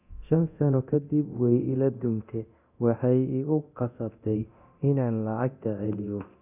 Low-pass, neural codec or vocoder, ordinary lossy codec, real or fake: 3.6 kHz; codec, 24 kHz, 0.9 kbps, DualCodec; none; fake